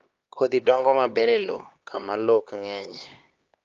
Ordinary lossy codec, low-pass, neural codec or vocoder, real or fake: Opus, 32 kbps; 7.2 kHz; codec, 16 kHz, 2 kbps, X-Codec, HuBERT features, trained on LibriSpeech; fake